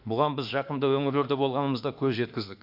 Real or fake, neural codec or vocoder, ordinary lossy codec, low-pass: fake; autoencoder, 48 kHz, 32 numbers a frame, DAC-VAE, trained on Japanese speech; none; 5.4 kHz